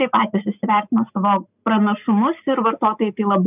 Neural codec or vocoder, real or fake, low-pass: none; real; 3.6 kHz